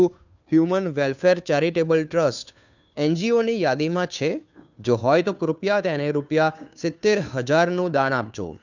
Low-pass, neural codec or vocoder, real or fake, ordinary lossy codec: 7.2 kHz; codec, 16 kHz, 2 kbps, FunCodec, trained on Chinese and English, 25 frames a second; fake; none